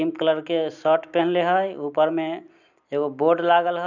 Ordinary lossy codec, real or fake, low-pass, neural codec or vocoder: none; real; 7.2 kHz; none